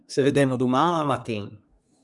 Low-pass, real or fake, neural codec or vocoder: 10.8 kHz; fake; codec, 24 kHz, 1 kbps, SNAC